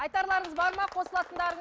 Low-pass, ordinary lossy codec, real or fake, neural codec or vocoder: none; none; real; none